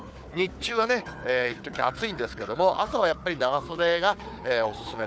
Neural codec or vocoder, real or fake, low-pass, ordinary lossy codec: codec, 16 kHz, 4 kbps, FunCodec, trained on Chinese and English, 50 frames a second; fake; none; none